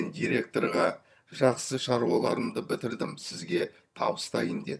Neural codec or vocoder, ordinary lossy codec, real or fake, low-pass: vocoder, 22.05 kHz, 80 mel bands, HiFi-GAN; none; fake; none